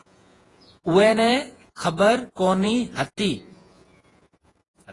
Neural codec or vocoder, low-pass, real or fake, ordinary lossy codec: vocoder, 48 kHz, 128 mel bands, Vocos; 10.8 kHz; fake; AAC, 32 kbps